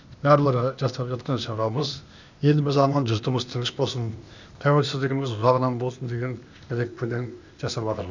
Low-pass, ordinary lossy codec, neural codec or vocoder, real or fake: 7.2 kHz; none; codec, 16 kHz, 0.8 kbps, ZipCodec; fake